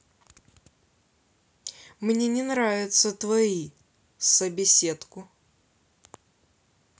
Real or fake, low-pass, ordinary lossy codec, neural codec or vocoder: real; none; none; none